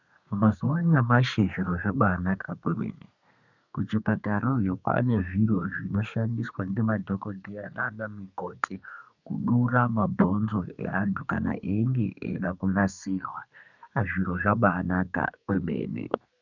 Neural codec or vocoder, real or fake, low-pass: codec, 32 kHz, 1.9 kbps, SNAC; fake; 7.2 kHz